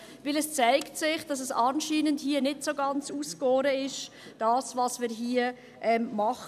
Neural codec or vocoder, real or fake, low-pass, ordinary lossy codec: none; real; 14.4 kHz; none